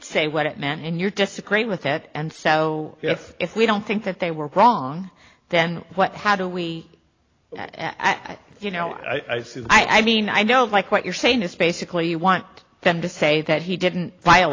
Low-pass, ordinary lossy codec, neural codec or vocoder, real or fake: 7.2 kHz; AAC, 32 kbps; none; real